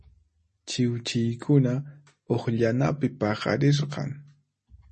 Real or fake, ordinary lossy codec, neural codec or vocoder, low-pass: real; MP3, 32 kbps; none; 9.9 kHz